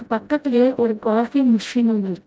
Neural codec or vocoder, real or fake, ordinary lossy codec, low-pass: codec, 16 kHz, 0.5 kbps, FreqCodec, smaller model; fake; none; none